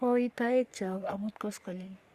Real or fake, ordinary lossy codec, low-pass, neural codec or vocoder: fake; Opus, 64 kbps; 14.4 kHz; codec, 44.1 kHz, 3.4 kbps, Pupu-Codec